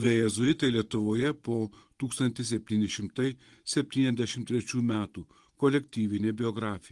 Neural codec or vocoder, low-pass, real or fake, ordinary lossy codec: vocoder, 24 kHz, 100 mel bands, Vocos; 10.8 kHz; fake; Opus, 24 kbps